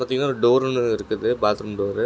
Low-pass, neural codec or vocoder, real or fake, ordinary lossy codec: none; none; real; none